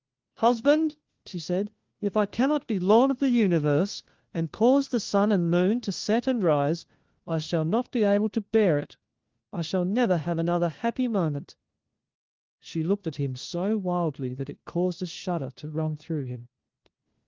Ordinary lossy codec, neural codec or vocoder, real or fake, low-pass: Opus, 16 kbps; codec, 16 kHz, 1 kbps, FunCodec, trained on LibriTTS, 50 frames a second; fake; 7.2 kHz